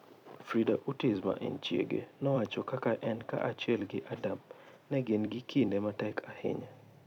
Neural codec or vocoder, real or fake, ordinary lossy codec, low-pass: vocoder, 44.1 kHz, 128 mel bands every 256 samples, BigVGAN v2; fake; none; 19.8 kHz